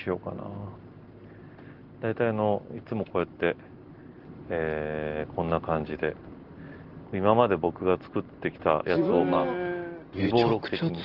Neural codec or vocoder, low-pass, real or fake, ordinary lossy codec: none; 5.4 kHz; real; Opus, 16 kbps